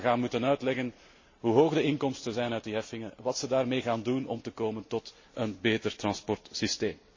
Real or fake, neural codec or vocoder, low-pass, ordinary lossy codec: real; none; 7.2 kHz; MP3, 32 kbps